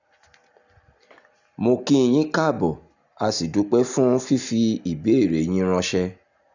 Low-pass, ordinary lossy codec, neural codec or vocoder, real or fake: 7.2 kHz; none; none; real